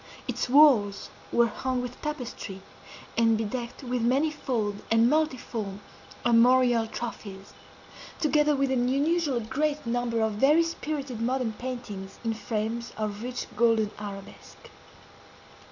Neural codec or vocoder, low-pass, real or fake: none; 7.2 kHz; real